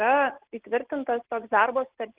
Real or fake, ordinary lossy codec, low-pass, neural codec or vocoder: real; Opus, 16 kbps; 3.6 kHz; none